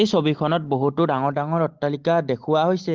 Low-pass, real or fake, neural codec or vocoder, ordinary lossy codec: 7.2 kHz; real; none; Opus, 16 kbps